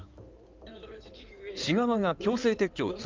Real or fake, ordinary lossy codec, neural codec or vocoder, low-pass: fake; Opus, 24 kbps; codec, 16 kHz in and 24 kHz out, 2.2 kbps, FireRedTTS-2 codec; 7.2 kHz